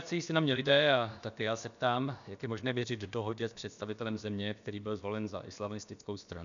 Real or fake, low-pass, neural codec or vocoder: fake; 7.2 kHz; codec, 16 kHz, about 1 kbps, DyCAST, with the encoder's durations